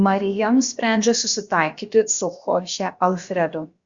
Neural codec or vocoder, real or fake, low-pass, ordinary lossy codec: codec, 16 kHz, about 1 kbps, DyCAST, with the encoder's durations; fake; 7.2 kHz; Opus, 64 kbps